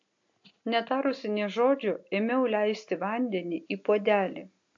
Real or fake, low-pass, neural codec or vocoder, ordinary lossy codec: real; 7.2 kHz; none; AAC, 48 kbps